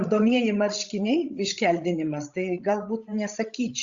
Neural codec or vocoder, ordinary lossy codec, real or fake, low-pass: codec, 16 kHz, 16 kbps, FreqCodec, larger model; Opus, 64 kbps; fake; 7.2 kHz